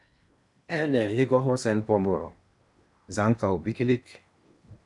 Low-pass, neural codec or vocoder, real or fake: 10.8 kHz; codec, 16 kHz in and 24 kHz out, 0.8 kbps, FocalCodec, streaming, 65536 codes; fake